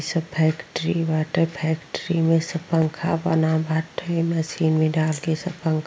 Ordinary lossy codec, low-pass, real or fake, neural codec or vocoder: none; none; real; none